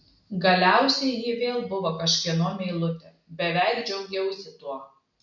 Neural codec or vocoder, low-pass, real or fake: none; 7.2 kHz; real